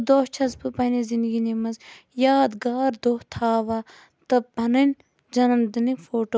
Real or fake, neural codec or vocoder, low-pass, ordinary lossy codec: real; none; none; none